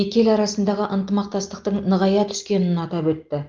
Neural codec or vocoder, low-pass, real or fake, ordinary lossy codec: none; 7.2 kHz; real; Opus, 32 kbps